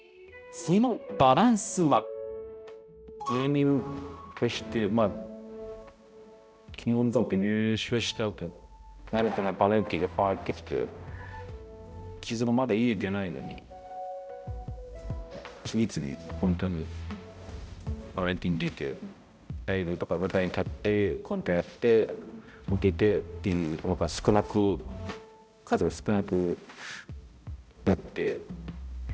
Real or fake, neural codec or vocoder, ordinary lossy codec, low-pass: fake; codec, 16 kHz, 0.5 kbps, X-Codec, HuBERT features, trained on balanced general audio; none; none